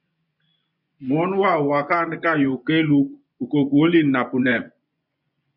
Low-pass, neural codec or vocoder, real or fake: 5.4 kHz; vocoder, 24 kHz, 100 mel bands, Vocos; fake